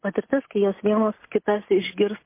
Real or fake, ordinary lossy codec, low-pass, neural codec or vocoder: real; MP3, 24 kbps; 3.6 kHz; none